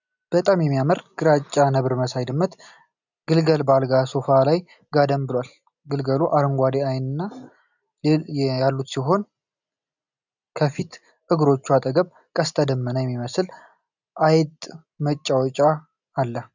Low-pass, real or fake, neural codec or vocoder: 7.2 kHz; real; none